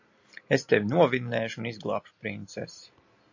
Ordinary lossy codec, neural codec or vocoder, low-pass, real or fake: AAC, 48 kbps; none; 7.2 kHz; real